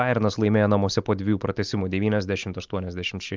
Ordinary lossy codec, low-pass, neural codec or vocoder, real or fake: Opus, 24 kbps; 7.2 kHz; none; real